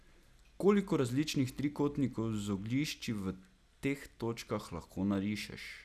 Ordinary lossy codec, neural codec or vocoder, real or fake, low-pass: none; none; real; 14.4 kHz